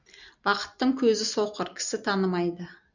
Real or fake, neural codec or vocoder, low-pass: real; none; 7.2 kHz